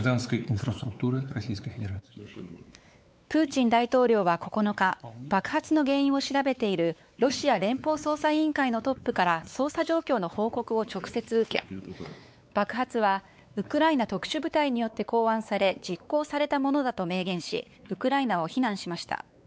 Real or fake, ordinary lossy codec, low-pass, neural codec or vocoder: fake; none; none; codec, 16 kHz, 4 kbps, X-Codec, WavLM features, trained on Multilingual LibriSpeech